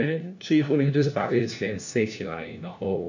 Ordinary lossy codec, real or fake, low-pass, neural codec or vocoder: none; fake; 7.2 kHz; codec, 16 kHz, 1 kbps, FunCodec, trained on LibriTTS, 50 frames a second